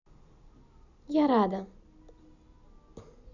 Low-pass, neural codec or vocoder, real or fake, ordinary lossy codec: 7.2 kHz; none; real; none